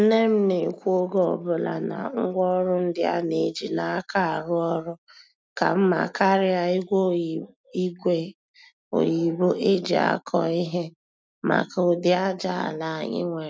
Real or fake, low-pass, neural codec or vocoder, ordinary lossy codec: real; none; none; none